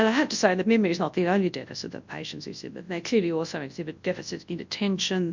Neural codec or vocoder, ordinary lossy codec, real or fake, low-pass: codec, 24 kHz, 0.9 kbps, WavTokenizer, large speech release; MP3, 64 kbps; fake; 7.2 kHz